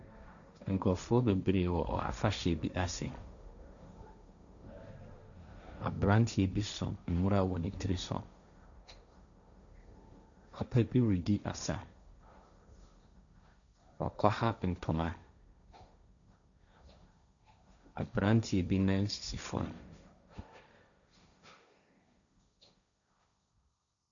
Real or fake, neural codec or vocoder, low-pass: fake; codec, 16 kHz, 1.1 kbps, Voila-Tokenizer; 7.2 kHz